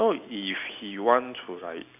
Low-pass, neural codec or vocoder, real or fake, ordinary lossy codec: 3.6 kHz; none; real; none